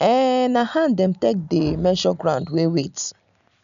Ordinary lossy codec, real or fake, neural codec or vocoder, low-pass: none; real; none; 7.2 kHz